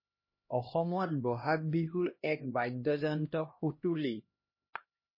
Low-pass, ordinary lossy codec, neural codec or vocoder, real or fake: 5.4 kHz; MP3, 24 kbps; codec, 16 kHz, 1 kbps, X-Codec, HuBERT features, trained on LibriSpeech; fake